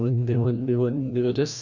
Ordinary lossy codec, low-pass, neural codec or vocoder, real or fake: none; 7.2 kHz; codec, 16 kHz, 1 kbps, FreqCodec, larger model; fake